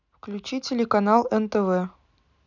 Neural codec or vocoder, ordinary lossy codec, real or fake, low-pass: none; none; real; 7.2 kHz